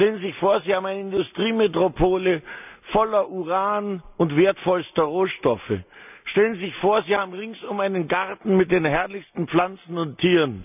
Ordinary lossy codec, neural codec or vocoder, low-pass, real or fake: none; none; 3.6 kHz; real